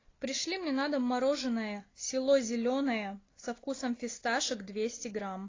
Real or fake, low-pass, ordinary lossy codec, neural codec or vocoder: real; 7.2 kHz; AAC, 32 kbps; none